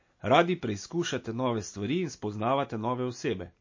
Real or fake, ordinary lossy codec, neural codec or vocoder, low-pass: real; MP3, 32 kbps; none; 7.2 kHz